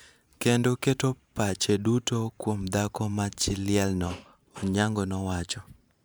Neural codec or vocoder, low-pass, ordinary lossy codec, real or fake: vocoder, 44.1 kHz, 128 mel bands every 512 samples, BigVGAN v2; none; none; fake